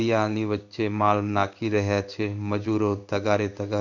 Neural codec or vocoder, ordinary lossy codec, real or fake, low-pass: codec, 16 kHz in and 24 kHz out, 1 kbps, XY-Tokenizer; none; fake; 7.2 kHz